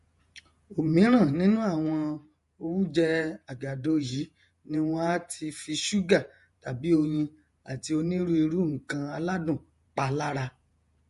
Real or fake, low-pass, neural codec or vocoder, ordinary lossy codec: fake; 14.4 kHz; vocoder, 48 kHz, 128 mel bands, Vocos; MP3, 48 kbps